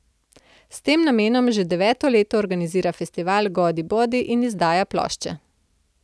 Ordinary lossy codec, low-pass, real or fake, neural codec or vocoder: none; none; real; none